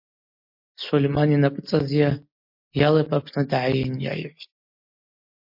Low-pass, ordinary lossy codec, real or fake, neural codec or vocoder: 5.4 kHz; MP3, 32 kbps; real; none